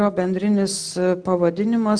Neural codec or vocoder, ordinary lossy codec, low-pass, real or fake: none; Opus, 16 kbps; 9.9 kHz; real